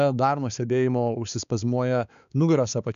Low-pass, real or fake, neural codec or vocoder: 7.2 kHz; fake; codec, 16 kHz, 4 kbps, X-Codec, HuBERT features, trained on balanced general audio